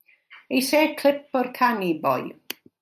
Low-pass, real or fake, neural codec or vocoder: 14.4 kHz; real; none